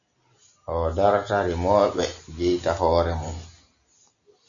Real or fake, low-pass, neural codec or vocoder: real; 7.2 kHz; none